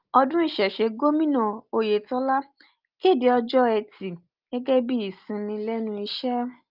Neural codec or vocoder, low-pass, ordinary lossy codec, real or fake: none; 5.4 kHz; Opus, 24 kbps; real